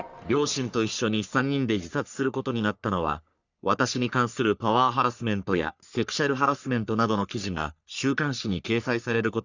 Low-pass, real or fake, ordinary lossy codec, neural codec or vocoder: 7.2 kHz; fake; none; codec, 44.1 kHz, 3.4 kbps, Pupu-Codec